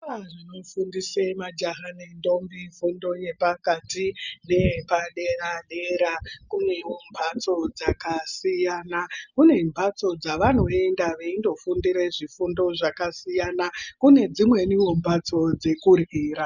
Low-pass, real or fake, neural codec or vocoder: 7.2 kHz; real; none